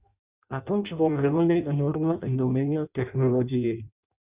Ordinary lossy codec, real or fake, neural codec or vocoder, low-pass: Opus, 64 kbps; fake; codec, 16 kHz in and 24 kHz out, 0.6 kbps, FireRedTTS-2 codec; 3.6 kHz